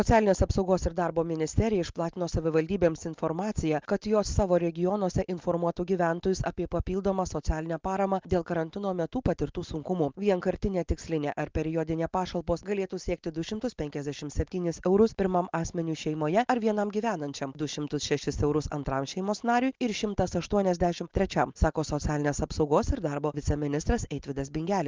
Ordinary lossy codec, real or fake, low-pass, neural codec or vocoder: Opus, 32 kbps; real; 7.2 kHz; none